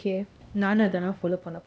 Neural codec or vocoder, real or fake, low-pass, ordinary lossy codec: codec, 16 kHz, 1 kbps, X-Codec, HuBERT features, trained on LibriSpeech; fake; none; none